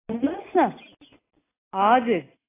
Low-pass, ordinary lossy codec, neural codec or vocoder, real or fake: 3.6 kHz; AAC, 16 kbps; none; real